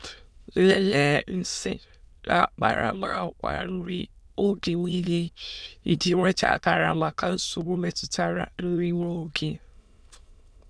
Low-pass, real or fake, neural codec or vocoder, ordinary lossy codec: none; fake; autoencoder, 22.05 kHz, a latent of 192 numbers a frame, VITS, trained on many speakers; none